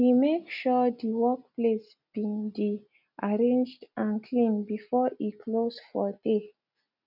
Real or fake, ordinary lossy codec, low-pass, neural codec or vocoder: real; none; 5.4 kHz; none